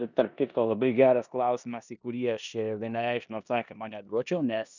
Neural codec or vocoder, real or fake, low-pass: codec, 16 kHz in and 24 kHz out, 0.9 kbps, LongCat-Audio-Codec, four codebook decoder; fake; 7.2 kHz